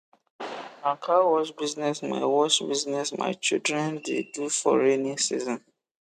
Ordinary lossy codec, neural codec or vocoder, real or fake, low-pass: none; none; real; 14.4 kHz